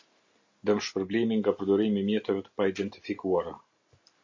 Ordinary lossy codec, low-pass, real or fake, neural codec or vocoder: MP3, 48 kbps; 7.2 kHz; real; none